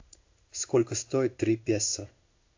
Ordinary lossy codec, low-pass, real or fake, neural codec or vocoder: AAC, 48 kbps; 7.2 kHz; fake; codec, 16 kHz in and 24 kHz out, 1 kbps, XY-Tokenizer